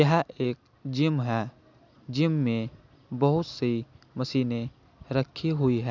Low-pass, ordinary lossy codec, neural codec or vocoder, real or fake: 7.2 kHz; none; none; real